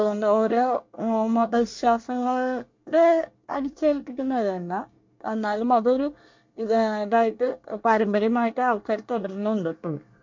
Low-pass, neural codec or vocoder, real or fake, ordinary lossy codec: 7.2 kHz; codec, 24 kHz, 1 kbps, SNAC; fake; MP3, 48 kbps